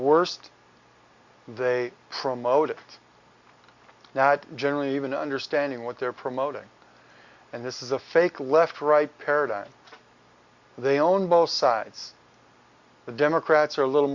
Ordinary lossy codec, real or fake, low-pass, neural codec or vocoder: Opus, 64 kbps; real; 7.2 kHz; none